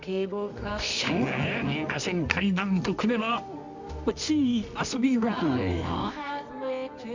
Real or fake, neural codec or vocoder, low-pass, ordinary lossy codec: fake; codec, 24 kHz, 0.9 kbps, WavTokenizer, medium music audio release; 7.2 kHz; MP3, 64 kbps